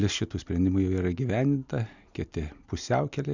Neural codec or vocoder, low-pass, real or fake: none; 7.2 kHz; real